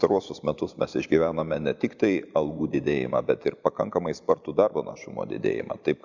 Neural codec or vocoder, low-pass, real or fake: none; 7.2 kHz; real